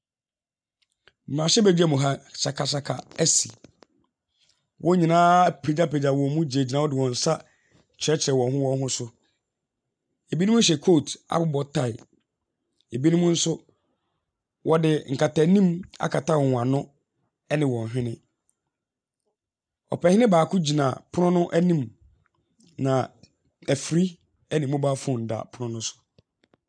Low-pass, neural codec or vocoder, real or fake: 9.9 kHz; none; real